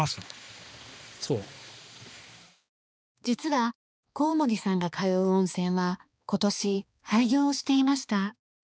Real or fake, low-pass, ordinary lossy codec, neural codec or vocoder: fake; none; none; codec, 16 kHz, 2 kbps, X-Codec, HuBERT features, trained on balanced general audio